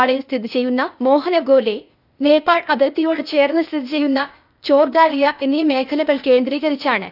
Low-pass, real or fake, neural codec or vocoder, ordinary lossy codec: 5.4 kHz; fake; codec, 16 kHz, 0.8 kbps, ZipCodec; none